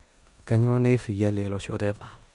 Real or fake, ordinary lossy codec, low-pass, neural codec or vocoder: fake; none; 10.8 kHz; codec, 16 kHz in and 24 kHz out, 0.9 kbps, LongCat-Audio-Codec, four codebook decoder